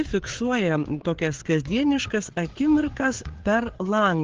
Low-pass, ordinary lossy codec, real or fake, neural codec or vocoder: 7.2 kHz; Opus, 16 kbps; fake; codec, 16 kHz, 4 kbps, FreqCodec, larger model